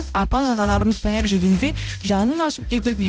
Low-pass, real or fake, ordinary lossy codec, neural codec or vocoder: none; fake; none; codec, 16 kHz, 0.5 kbps, X-Codec, HuBERT features, trained on balanced general audio